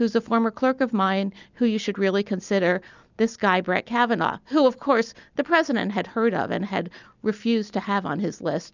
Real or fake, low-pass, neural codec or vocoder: real; 7.2 kHz; none